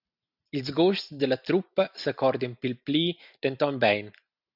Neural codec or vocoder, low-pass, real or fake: none; 5.4 kHz; real